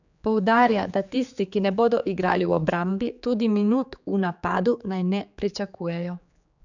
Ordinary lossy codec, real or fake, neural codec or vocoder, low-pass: none; fake; codec, 16 kHz, 4 kbps, X-Codec, HuBERT features, trained on general audio; 7.2 kHz